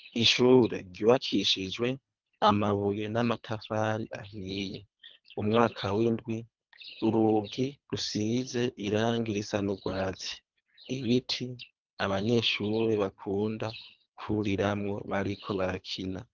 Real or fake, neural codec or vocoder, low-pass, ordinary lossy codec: fake; codec, 24 kHz, 3 kbps, HILCodec; 7.2 kHz; Opus, 32 kbps